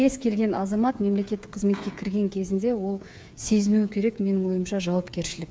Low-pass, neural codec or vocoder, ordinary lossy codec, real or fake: none; codec, 16 kHz, 8 kbps, FreqCodec, smaller model; none; fake